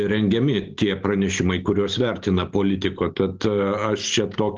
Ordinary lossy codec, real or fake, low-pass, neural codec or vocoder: Opus, 16 kbps; real; 7.2 kHz; none